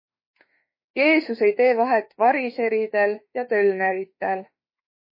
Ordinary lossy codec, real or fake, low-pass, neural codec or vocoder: MP3, 24 kbps; fake; 5.4 kHz; autoencoder, 48 kHz, 32 numbers a frame, DAC-VAE, trained on Japanese speech